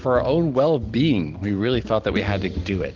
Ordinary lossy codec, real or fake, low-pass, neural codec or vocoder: Opus, 16 kbps; real; 7.2 kHz; none